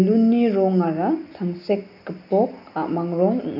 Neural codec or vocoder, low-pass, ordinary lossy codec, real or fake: none; 5.4 kHz; none; real